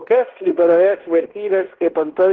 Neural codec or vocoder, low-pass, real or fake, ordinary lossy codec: codec, 16 kHz in and 24 kHz out, 0.9 kbps, LongCat-Audio-Codec, fine tuned four codebook decoder; 7.2 kHz; fake; Opus, 16 kbps